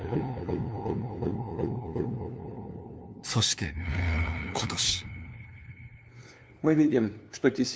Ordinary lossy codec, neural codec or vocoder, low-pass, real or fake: none; codec, 16 kHz, 2 kbps, FunCodec, trained on LibriTTS, 25 frames a second; none; fake